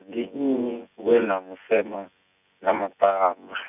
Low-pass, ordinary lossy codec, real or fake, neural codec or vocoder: 3.6 kHz; none; fake; vocoder, 24 kHz, 100 mel bands, Vocos